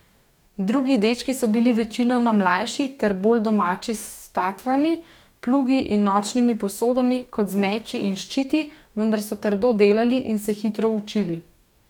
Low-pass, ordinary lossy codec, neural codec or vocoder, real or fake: 19.8 kHz; none; codec, 44.1 kHz, 2.6 kbps, DAC; fake